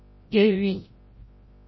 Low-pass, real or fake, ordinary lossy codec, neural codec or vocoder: 7.2 kHz; fake; MP3, 24 kbps; codec, 16 kHz, 0.5 kbps, FreqCodec, larger model